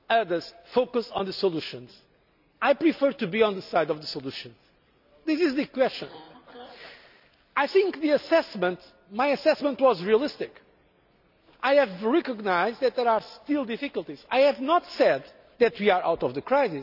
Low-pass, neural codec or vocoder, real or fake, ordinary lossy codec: 5.4 kHz; none; real; none